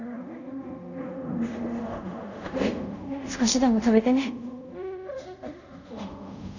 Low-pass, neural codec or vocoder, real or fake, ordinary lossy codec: 7.2 kHz; codec, 24 kHz, 0.5 kbps, DualCodec; fake; none